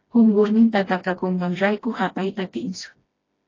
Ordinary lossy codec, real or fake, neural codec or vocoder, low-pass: AAC, 32 kbps; fake; codec, 16 kHz, 1 kbps, FreqCodec, smaller model; 7.2 kHz